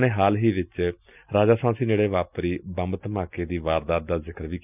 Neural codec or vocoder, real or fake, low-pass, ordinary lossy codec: none; real; 3.6 kHz; none